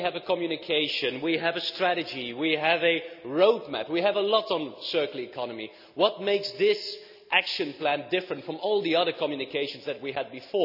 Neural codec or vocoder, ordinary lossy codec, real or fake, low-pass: none; none; real; 5.4 kHz